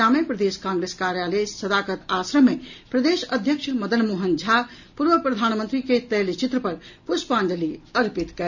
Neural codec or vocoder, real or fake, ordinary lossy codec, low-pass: none; real; none; 7.2 kHz